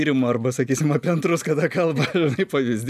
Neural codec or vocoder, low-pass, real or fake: none; 14.4 kHz; real